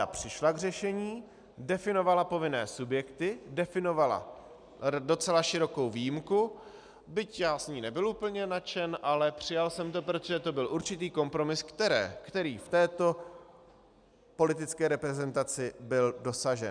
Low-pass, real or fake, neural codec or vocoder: 9.9 kHz; real; none